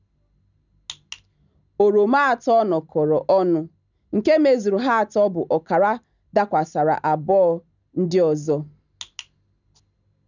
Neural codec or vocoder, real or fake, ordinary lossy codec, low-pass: none; real; none; 7.2 kHz